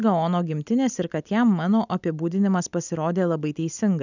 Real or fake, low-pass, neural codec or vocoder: real; 7.2 kHz; none